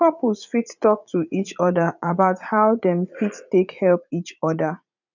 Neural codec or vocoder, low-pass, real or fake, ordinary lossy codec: vocoder, 44.1 kHz, 80 mel bands, Vocos; 7.2 kHz; fake; none